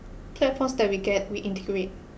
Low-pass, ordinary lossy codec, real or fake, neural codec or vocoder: none; none; real; none